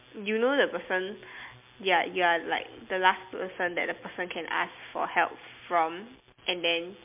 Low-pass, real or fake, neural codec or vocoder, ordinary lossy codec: 3.6 kHz; real; none; MP3, 32 kbps